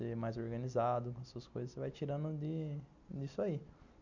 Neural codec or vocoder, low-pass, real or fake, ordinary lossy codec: none; 7.2 kHz; real; none